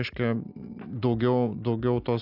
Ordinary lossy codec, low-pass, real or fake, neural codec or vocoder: AAC, 48 kbps; 5.4 kHz; real; none